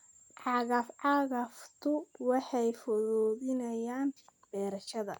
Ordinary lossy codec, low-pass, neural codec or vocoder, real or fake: none; 19.8 kHz; none; real